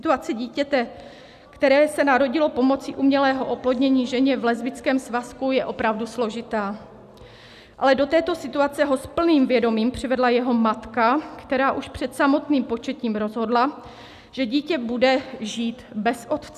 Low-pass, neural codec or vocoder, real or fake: 14.4 kHz; none; real